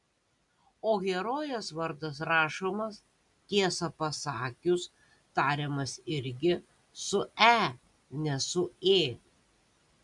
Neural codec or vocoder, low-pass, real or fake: none; 10.8 kHz; real